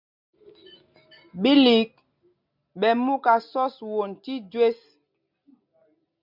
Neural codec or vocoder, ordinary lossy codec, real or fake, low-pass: none; MP3, 48 kbps; real; 5.4 kHz